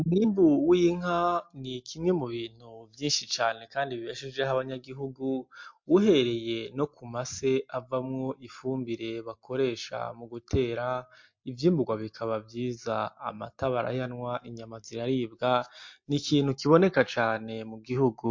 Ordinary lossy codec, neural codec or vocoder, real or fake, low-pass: MP3, 48 kbps; none; real; 7.2 kHz